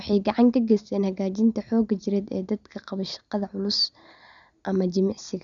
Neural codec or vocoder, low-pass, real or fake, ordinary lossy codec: none; 7.2 kHz; real; none